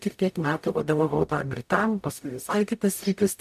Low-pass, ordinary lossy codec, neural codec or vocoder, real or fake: 14.4 kHz; MP3, 96 kbps; codec, 44.1 kHz, 0.9 kbps, DAC; fake